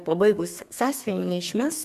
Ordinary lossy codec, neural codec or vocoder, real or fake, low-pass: AAC, 96 kbps; codec, 32 kHz, 1.9 kbps, SNAC; fake; 14.4 kHz